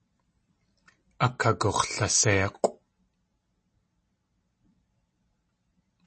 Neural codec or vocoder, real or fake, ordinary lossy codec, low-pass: none; real; MP3, 32 kbps; 9.9 kHz